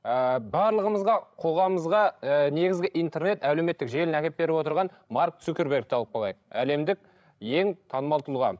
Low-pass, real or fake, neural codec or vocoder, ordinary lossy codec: none; fake; codec, 16 kHz, 16 kbps, FreqCodec, larger model; none